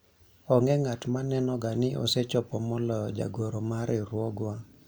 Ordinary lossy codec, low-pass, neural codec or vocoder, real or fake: none; none; none; real